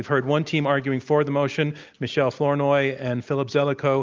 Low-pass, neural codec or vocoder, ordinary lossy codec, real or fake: 7.2 kHz; none; Opus, 24 kbps; real